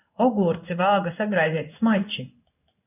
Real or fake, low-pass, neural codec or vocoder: fake; 3.6 kHz; codec, 16 kHz in and 24 kHz out, 1 kbps, XY-Tokenizer